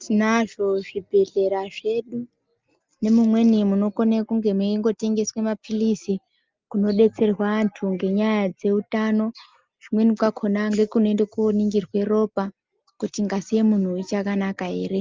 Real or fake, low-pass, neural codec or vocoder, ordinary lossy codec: real; 7.2 kHz; none; Opus, 24 kbps